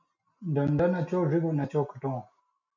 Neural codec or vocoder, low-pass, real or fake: none; 7.2 kHz; real